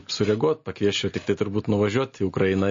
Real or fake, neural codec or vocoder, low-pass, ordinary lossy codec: real; none; 7.2 kHz; MP3, 32 kbps